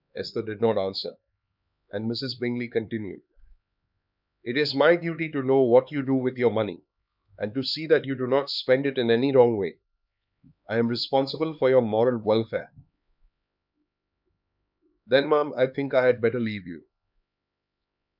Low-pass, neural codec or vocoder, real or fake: 5.4 kHz; codec, 16 kHz, 4 kbps, X-Codec, HuBERT features, trained on LibriSpeech; fake